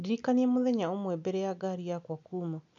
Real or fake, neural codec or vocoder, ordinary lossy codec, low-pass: real; none; none; 7.2 kHz